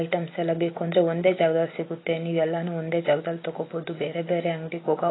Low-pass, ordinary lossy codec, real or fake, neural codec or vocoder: 7.2 kHz; AAC, 16 kbps; real; none